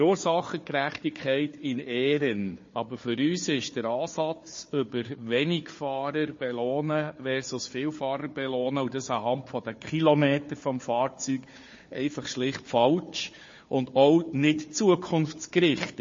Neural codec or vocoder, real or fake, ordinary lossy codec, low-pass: codec, 16 kHz, 4 kbps, FunCodec, trained on Chinese and English, 50 frames a second; fake; MP3, 32 kbps; 7.2 kHz